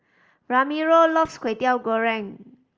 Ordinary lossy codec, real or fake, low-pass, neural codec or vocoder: Opus, 24 kbps; real; 7.2 kHz; none